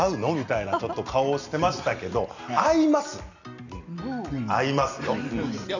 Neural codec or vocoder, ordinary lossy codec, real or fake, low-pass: none; none; real; 7.2 kHz